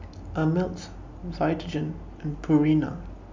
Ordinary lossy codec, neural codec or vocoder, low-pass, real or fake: MP3, 64 kbps; none; 7.2 kHz; real